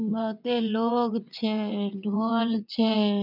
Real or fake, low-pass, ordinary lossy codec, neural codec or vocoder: fake; 5.4 kHz; none; vocoder, 22.05 kHz, 80 mel bands, WaveNeXt